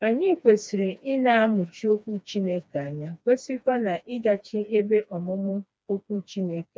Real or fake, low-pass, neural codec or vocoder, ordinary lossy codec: fake; none; codec, 16 kHz, 2 kbps, FreqCodec, smaller model; none